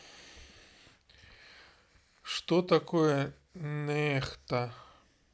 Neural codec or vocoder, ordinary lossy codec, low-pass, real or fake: none; none; none; real